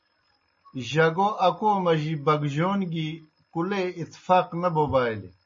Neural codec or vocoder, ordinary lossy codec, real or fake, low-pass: none; MP3, 32 kbps; real; 7.2 kHz